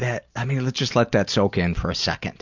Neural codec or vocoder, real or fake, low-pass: none; real; 7.2 kHz